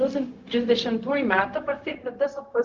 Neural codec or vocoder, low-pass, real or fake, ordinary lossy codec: codec, 16 kHz, 0.4 kbps, LongCat-Audio-Codec; 7.2 kHz; fake; Opus, 32 kbps